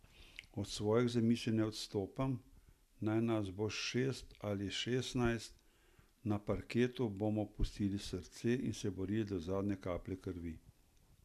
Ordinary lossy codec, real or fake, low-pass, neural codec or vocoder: none; real; 14.4 kHz; none